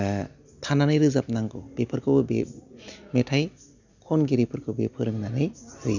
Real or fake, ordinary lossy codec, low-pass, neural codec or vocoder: real; none; 7.2 kHz; none